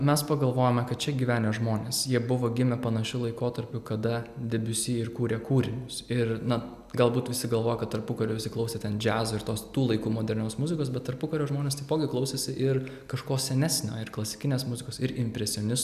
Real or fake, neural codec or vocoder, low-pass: real; none; 14.4 kHz